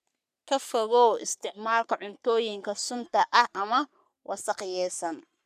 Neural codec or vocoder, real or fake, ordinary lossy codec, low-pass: codec, 44.1 kHz, 3.4 kbps, Pupu-Codec; fake; none; 14.4 kHz